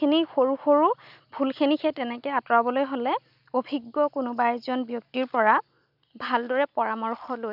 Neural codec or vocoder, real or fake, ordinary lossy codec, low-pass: none; real; none; 5.4 kHz